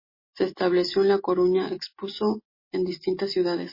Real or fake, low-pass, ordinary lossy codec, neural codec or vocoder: real; 5.4 kHz; MP3, 24 kbps; none